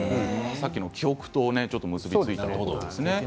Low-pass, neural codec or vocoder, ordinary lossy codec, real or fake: none; none; none; real